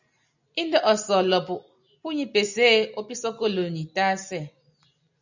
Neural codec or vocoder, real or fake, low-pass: none; real; 7.2 kHz